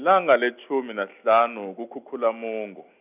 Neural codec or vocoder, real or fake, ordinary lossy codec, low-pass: none; real; none; 3.6 kHz